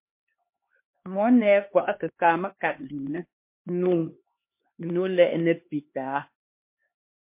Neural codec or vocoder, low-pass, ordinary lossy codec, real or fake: codec, 16 kHz, 2 kbps, X-Codec, HuBERT features, trained on LibriSpeech; 3.6 kHz; MP3, 24 kbps; fake